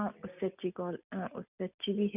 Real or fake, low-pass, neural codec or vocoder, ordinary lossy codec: real; 3.6 kHz; none; none